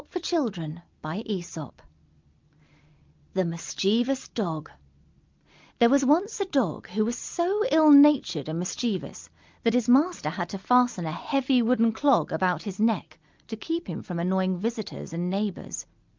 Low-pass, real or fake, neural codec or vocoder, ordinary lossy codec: 7.2 kHz; real; none; Opus, 24 kbps